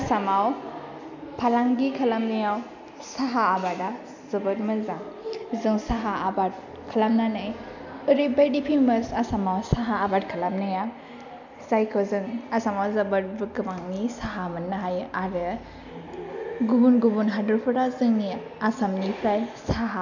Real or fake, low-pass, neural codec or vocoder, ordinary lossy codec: real; 7.2 kHz; none; none